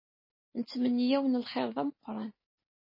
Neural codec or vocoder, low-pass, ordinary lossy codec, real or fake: none; 5.4 kHz; MP3, 24 kbps; real